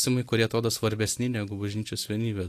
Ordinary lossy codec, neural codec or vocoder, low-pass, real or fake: AAC, 64 kbps; none; 14.4 kHz; real